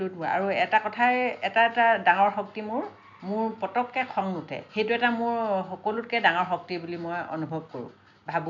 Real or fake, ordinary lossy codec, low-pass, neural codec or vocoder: real; none; 7.2 kHz; none